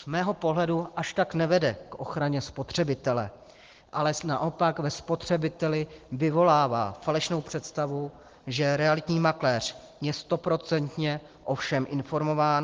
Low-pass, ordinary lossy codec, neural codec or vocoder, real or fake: 7.2 kHz; Opus, 16 kbps; none; real